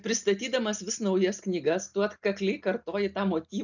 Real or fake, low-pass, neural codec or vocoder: real; 7.2 kHz; none